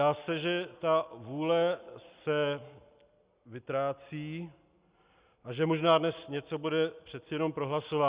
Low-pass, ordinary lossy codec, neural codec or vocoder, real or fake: 3.6 kHz; Opus, 64 kbps; none; real